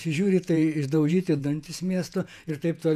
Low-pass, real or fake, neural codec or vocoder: 14.4 kHz; fake; vocoder, 48 kHz, 128 mel bands, Vocos